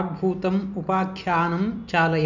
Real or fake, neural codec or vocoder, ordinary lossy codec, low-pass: real; none; none; 7.2 kHz